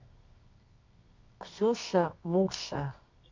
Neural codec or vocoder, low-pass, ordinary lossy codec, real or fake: codec, 24 kHz, 0.9 kbps, WavTokenizer, medium music audio release; 7.2 kHz; MP3, 64 kbps; fake